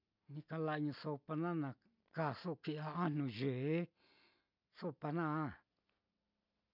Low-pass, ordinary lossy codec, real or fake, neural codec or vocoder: 5.4 kHz; MP3, 48 kbps; real; none